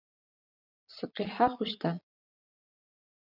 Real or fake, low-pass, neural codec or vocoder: fake; 5.4 kHz; vocoder, 22.05 kHz, 80 mel bands, Vocos